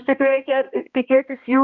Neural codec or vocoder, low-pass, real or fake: codec, 16 kHz, 1 kbps, X-Codec, HuBERT features, trained on balanced general audio; 7.2 kHz; fake